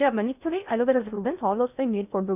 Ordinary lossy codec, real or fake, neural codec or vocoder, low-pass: none; fake; codec, 16 kHz in and 24 kHz out, 0.6 kbps, FocalCodec, streaming, 2048 codes; 3.6 kHz